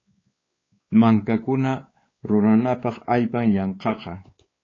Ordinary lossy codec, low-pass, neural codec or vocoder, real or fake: AAC, 32 kbps; 7.2 kHz; codec, 16 kHz, 4 kbps, X-Codec, WavLM features, trained on Multilingual LibriSpeech; fake